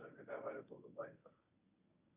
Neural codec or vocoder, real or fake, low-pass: codec, 24 kHz, 0.9 kbps, WavTokenizer, medium speech release version 1; fake; 3.6 kHz